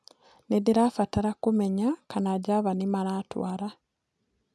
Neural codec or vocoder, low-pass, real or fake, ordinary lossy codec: none; none; real; none